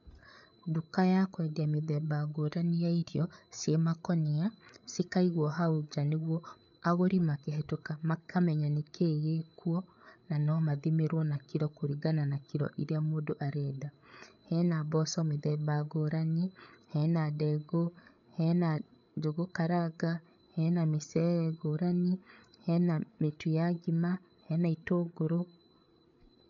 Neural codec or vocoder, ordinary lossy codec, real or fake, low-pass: codec, 16 kHz, 8 kbps, FreqCodec, larger model; none; fake; 7.2 kHz